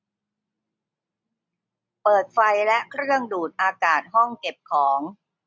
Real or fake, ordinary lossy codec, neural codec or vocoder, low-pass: real; none; none; none